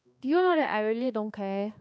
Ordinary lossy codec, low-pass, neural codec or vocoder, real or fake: none; none; codec, 16 kHz, 2 kbps, X-Codec, HuBERT features, trained on balanced general audio; fake